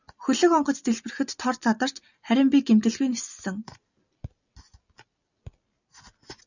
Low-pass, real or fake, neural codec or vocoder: 7.2 kHz; real; none